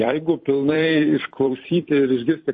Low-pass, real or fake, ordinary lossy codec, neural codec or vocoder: 10.8 kHz; fake; MP3, 32 kbps; vocoder, 44.1 kHz, 128 mel bands every 256 samples, BigVGAN v2